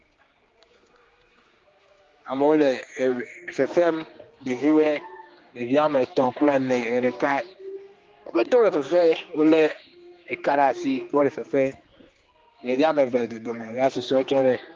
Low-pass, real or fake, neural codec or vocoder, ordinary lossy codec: 7.2 kHz; fake; codec, 16 kHz, 2 kbps, X-Codec, HuBERT features, trained on general audio; Opus, 32 kbps